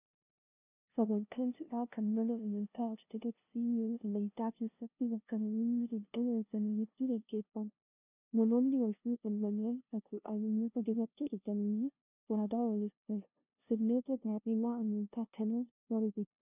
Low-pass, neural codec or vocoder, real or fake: 3.6 kHz; codec, 16 kHz, 0.5 kbps, FunCodec, trained on LibriTTS, 25 frames a second; fake